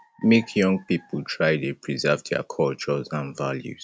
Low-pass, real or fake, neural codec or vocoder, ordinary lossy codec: none; real; none; none